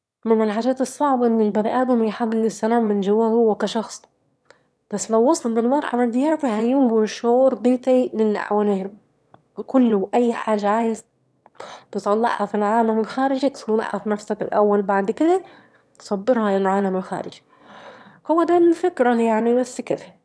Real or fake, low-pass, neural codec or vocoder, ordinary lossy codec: fake; none; autoencoder, 22.05 kHz, a latent of 192 numbers a frame, VITS, trained on one speaker; none